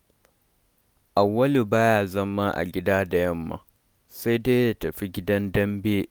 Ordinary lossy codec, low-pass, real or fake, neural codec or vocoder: none; none; real; none